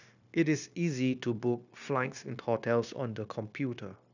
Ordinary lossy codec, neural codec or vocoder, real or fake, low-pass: none; codec, 16 kHz, 0.9 kbps, LongCat-Audio-Codec; fake; 7.2 kHz